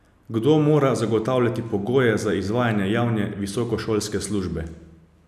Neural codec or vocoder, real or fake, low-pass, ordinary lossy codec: none; real; 14.4 kHz; none